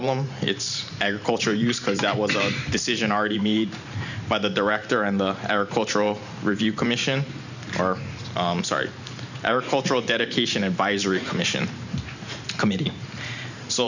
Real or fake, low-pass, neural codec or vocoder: fake; 7.2 kHz; vocoder, 44.1 kHz, 128 mel bands every 512 samples, BigVGAN v2